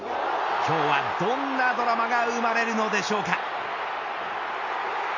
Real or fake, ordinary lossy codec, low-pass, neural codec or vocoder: real; none; 7.2 kHz; none